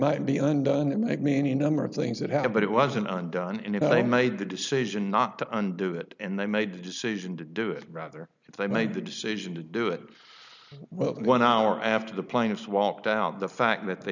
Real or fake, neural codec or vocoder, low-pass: real; none; 7.2 kHz